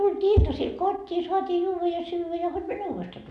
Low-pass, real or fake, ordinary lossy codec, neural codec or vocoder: none; real; none; none